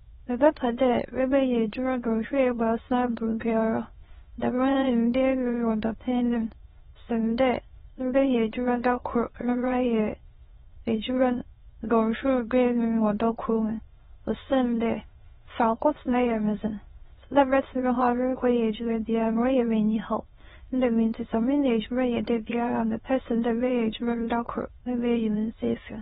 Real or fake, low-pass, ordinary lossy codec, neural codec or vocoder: fake; 9.9 kHz; AAC, 16 kbps; autoencoder, 22.05 kHz, a latent of 192 numbers a frame, VITS, trained on many speakers